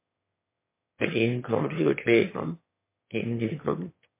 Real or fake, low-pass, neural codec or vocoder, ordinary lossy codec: fake; 3.6 kHz; autoencoder, 22.05 kHz, a latent of 192 numbers a frame, VITS, trained on one speaker; MP3, 24 kbps